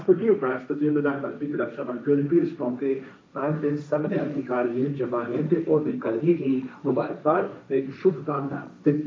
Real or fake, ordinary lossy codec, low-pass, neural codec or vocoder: fake; none; none; codec, 16 kHz, 1.1 kbps, Voila-Tokenizer